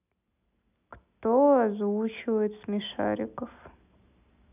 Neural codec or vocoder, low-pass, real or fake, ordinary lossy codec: none; 3.6 kHz; real; none